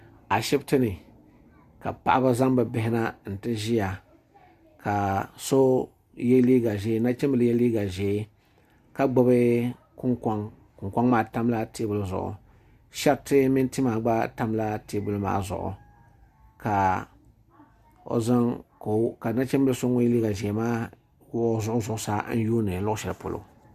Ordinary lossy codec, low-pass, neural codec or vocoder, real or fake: Opus, 64 kbps; 14.4 kHz; none; real